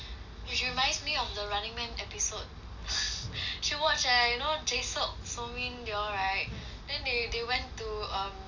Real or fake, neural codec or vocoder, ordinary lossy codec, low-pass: real; none; none; 7.2 kHz